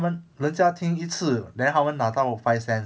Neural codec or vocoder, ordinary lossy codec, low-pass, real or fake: none; none; none; real